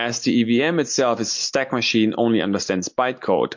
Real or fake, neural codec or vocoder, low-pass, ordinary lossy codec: real; none; 7.2 kHz; MP3, 48 kbps